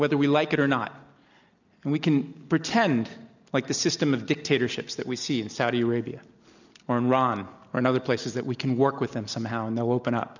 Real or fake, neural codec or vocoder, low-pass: real; none; 7.2 kHz